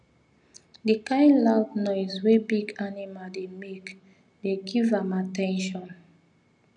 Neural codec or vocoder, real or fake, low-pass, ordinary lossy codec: none; real; 9.9 kHz; none